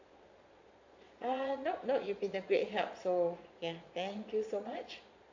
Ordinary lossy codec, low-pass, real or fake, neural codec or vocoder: AAC, 48 kbps; 7.2 kHz; fake; vocoder, 22.05 kHz, 80 mel bands, Vocos